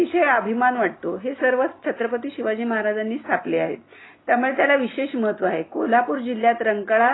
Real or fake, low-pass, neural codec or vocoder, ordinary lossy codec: real; 7.2 kHz; none; AAC, 16 kbps